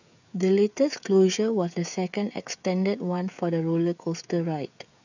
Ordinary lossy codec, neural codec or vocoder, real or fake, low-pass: none; codec, 16 kHz, 16 kbps, FreqCodec, smaller model; fake; 7.2 kHz